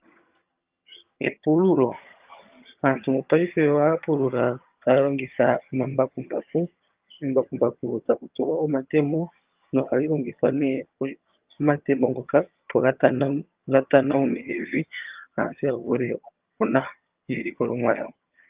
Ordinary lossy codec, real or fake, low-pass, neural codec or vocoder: Opus, 32 kbps; fake; 3.6 kHz; vocoder, 22.05 kHz, 80 mel bands, HiFi-GAN